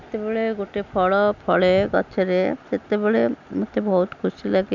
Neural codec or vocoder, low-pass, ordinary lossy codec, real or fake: none; 7.2 kHz; none; real